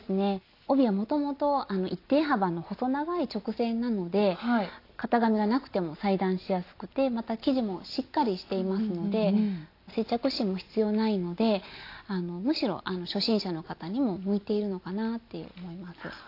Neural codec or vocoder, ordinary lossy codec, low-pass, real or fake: none; AAC, 32 kbps; 5.4 kHz; real